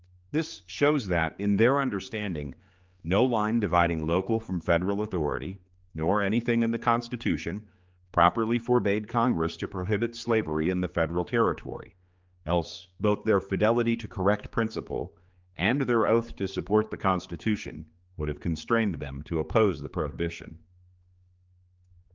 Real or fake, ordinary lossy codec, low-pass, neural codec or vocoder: fake; Opus, 24 kbps; 7.2 kHz; codec, 16 kHz, 4 kbps, X-Codec, HuBERT features, trained on general audio